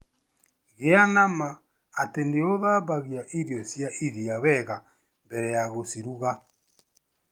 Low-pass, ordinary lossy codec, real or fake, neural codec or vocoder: 19.8 kHz; Opus, 32 kbps; real; none